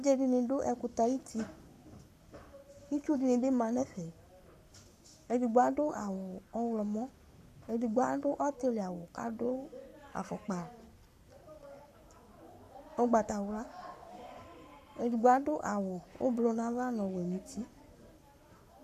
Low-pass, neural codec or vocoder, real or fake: 14.4 kHz; codec, 44.1 kHz, 7.8 kbps, Pupu-Codec; fake